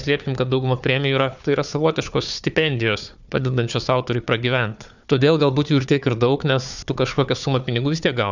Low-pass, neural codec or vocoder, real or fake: 7.2 kHz; codec, 16 kHz, 4 kbps, FunCodec, trained on Chinese and English, 50 frames a second; fake